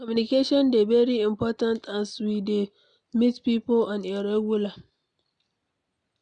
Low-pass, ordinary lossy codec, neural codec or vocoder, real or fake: 9.9 kHz; none; none; real